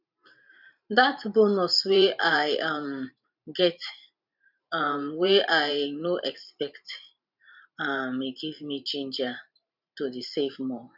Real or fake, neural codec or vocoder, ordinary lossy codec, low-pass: fake; vocoder, 44.1 kHz, 128 mel bands every 512 samples, BigVGAN v2; none; 5.4 kHz